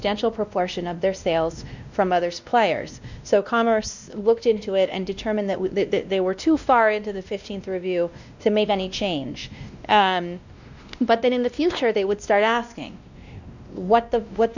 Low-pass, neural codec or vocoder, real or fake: 7.2 kHz; codec, 16 kHz, 1 kbps, X-Codec, WavLM features, trained on Multilingual LibriSpeech; fake